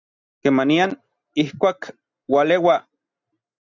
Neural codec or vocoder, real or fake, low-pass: none; real; 7.2 kHz